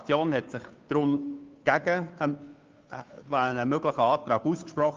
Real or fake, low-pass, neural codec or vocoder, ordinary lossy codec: fake; 7.2 kHz; codec, 16 kHz, 2 kbps, FunCodec, trained on Chinese and English, 25 frames a second; Opus, 24 kbps